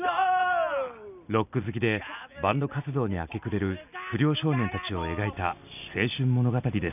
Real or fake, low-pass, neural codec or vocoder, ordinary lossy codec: real; 3.6 kHz; none; none